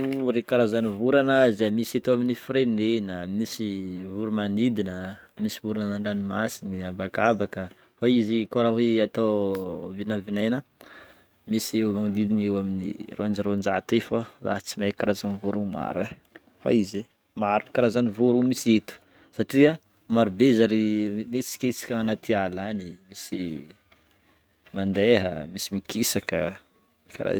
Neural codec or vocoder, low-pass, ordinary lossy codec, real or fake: codec, 44.1 kHz, 7.8 kbps, DAC; none; none; fake